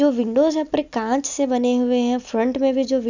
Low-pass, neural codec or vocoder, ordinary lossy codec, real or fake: 7.2 kHz; none; none; real